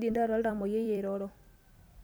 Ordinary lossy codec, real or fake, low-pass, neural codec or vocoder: none; fake; none; vocoder, 44.1 kHz, 128 mel bands every 256 samples, BigVGAN v2